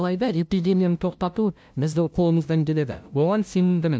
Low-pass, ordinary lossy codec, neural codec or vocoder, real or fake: none; none; codec, 16 kHz, 0.5 kbps, FunCodec, trained on LibriTTS, 25 frames a second; fake